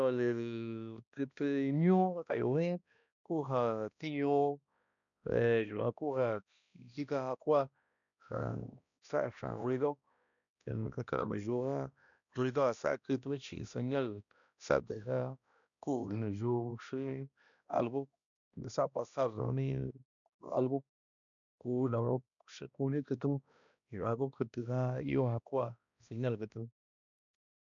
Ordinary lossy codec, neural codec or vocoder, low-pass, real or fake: none; codec, 16 kHz, 1 kbps, X-Codec, HuBERT features, trained on balanced general audio; 7.2 kHz; fake